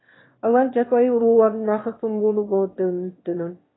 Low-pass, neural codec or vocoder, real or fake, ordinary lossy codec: 7.2 kHz; autoencoder, 22.05 kHz, a latent of 192 numbers a frame, VITS, trained on one speaker; fake; AAC, 16 kbps